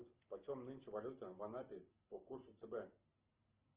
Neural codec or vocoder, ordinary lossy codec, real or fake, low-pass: none; Opus, 16 kbps; real; 3.6 kHz